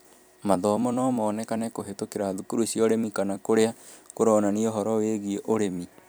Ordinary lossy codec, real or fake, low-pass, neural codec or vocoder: none; fake; none; vocoder, 44.1 kHz, 128 mel bands every 256 samples, BigVGAN v2